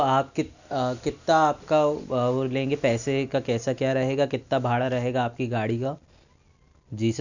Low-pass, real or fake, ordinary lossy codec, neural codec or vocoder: 7.2 kHz; real; none; none